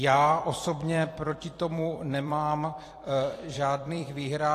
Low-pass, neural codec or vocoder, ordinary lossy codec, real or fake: 14.4 kHz; vocoder, 44.1 kHz, 128 mel bands every 256 samples, BigVGAN v2; AAC, 48 kbps; fake